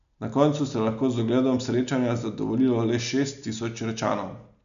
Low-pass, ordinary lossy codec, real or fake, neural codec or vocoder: 7.2 kHz; none; real; none